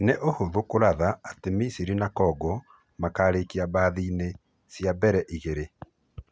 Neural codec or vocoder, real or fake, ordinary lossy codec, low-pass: none; real; none; none